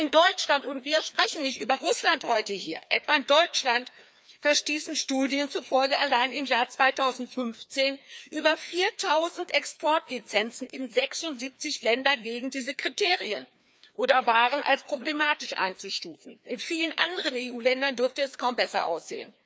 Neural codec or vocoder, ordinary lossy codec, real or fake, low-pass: codec, 16 kHz, 2 kbps, FreqCodec, larger model; none; fake; none